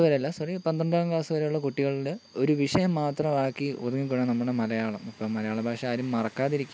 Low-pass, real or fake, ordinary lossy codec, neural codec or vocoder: none; real; none; none